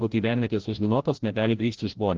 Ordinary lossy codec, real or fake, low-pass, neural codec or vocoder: Opus, 16 kbps; fake; 7.2 kHz; codec, 16 kHz, 0.5 kbps, FreqCodec, larger model